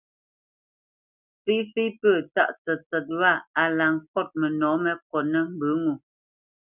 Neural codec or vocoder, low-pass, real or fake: none; 3.6 kHz; real